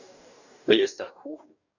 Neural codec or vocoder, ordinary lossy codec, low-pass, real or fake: codec, 44.1 kHz, 2.6 kbps, DAC; none; 7.2 kHz; fake